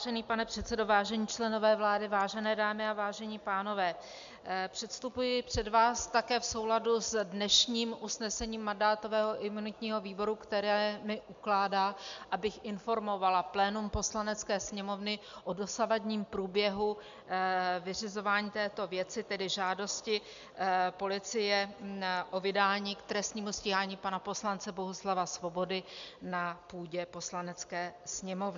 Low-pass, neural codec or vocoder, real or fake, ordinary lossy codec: 7.2 kHz; none; real; MP3, 64 kbps